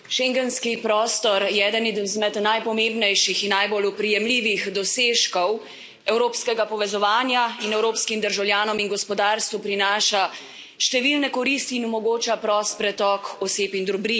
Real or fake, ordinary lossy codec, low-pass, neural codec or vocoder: real; none; none; none